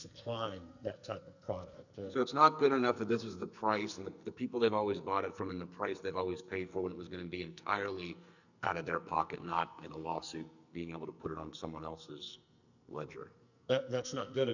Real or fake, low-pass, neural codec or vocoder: fake; 7.2 kHz; codec, 44.1 kHz, 2.6 kbps, SNAC